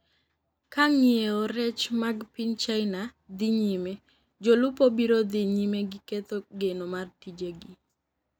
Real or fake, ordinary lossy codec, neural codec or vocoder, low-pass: real; none; none; 19.8 kHz